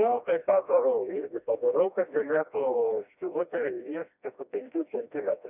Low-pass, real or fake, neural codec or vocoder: 3.6 kHz; fake; codec, 16 kHz, 1 kbps, FreqCodec, smaller model